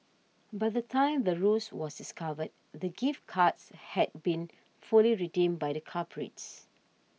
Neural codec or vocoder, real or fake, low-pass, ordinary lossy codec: none; real; none; none